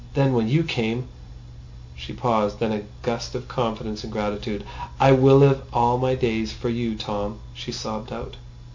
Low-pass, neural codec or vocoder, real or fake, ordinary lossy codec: 7.2 kHz; none; real; MP3, 64 kbps